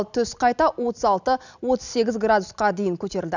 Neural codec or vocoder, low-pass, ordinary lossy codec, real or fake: none; 7.2 kHz; none; real